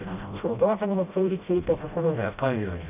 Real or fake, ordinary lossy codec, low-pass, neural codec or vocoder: fake; none; 3.6 kHz; codec, 16 kHz, 0.5 kbps, FreqCodec, smaller model